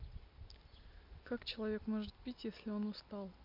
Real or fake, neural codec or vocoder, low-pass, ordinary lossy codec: real; none; 5.4 kHz; MP3, 48 kbps